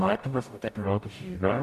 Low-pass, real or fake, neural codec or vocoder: 14.4 kHz; fake; codec, 44.1 kHz, 0.9 kbps, DAC